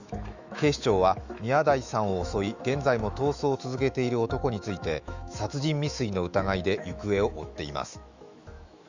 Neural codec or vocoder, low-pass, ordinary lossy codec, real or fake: autoencoder, 48 kHz, 128 numbers a frame, DAC-VAE, trained on Japanese speech; 7.2 kHz; Opus, 64 kbps; fake